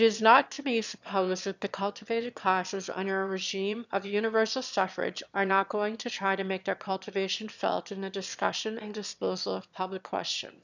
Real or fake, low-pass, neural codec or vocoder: fake; 7.2 kHz; autoencoder, 22.05 kHz, a latent of 192 numbers a frame, VITS, trained on one speaker